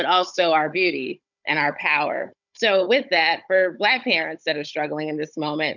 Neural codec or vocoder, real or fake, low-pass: codec, 16 kHz, 16 kbps, FunCodec, trained on Chinese and English, 50 frames a second; fake; 7.2 kHz